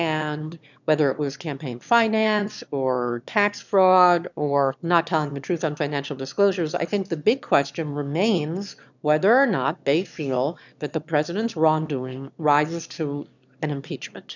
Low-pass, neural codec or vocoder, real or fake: 7.2 kHz; autoencoder, 22.05 kHz, a latent of 192 numbers a frame, VITS, trained on one speaker; fake